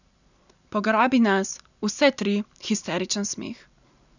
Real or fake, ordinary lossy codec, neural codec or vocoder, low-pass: real; none; none; 7.2 kHz